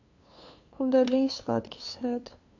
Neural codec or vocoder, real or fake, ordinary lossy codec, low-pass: codec, 16 kHz, 2 kbps, FunCodec, trained on LibriTTS, 25 frames a second; fake; AAC, 48 kbps; 7.2 kHz